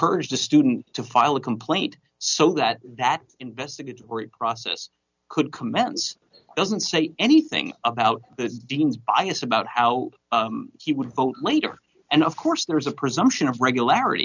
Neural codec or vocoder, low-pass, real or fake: none; 7.2 kHz; real